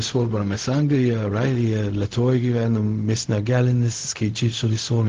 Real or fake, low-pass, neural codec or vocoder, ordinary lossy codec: fake; 7.2 kHz; codec, 16 kHz, 0.4 kbps, LongCat-Audio-Codec; Opus, 16 kbps